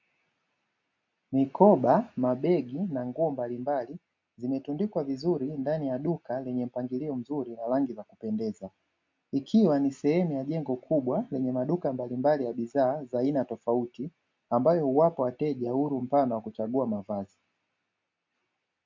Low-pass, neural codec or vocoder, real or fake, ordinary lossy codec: 7.2 kHz; none; real; MP3, 64 kbps